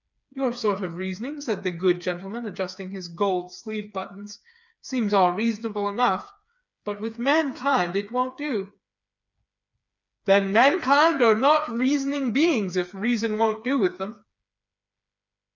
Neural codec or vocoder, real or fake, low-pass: codec, 16 kHz, 4 kbps, FreqCodec, smaller model; fake; 7.2 kHz